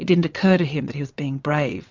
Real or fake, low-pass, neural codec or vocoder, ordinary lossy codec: fake; 7.2 kHz; vocoder, 22.05 kHz, 80 mel bands, WaveNeXt; MP3, 64 kbps